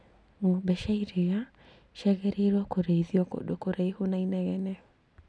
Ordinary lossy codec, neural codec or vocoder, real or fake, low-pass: none; none; real; none